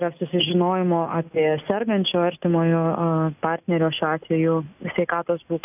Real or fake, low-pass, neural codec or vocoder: real; 3.6 kHz; none